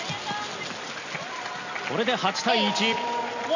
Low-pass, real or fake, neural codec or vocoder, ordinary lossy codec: 7.2 kHz; real; none; none